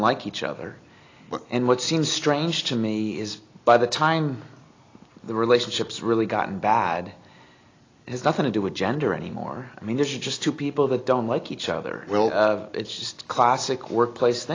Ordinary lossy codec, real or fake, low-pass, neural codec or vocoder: AAC, 32 kbps; real; 7.2 kHz; none